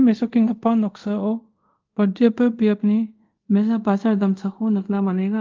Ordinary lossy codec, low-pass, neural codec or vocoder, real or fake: Opus, 32 kbps; 7.2 kHz; codec, 24 kHz, 0.5 kbps, DualCodec; fake